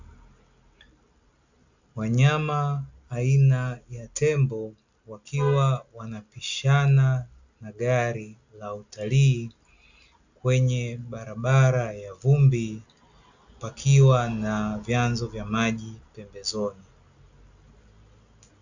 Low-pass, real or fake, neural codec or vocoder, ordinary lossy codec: 7.2 kHz; real; none; Opus, 64 kbps